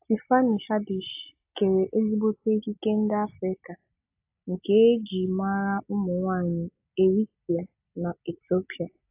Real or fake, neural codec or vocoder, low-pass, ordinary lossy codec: real; none; 3.6 kHz; AAC, 32 kbps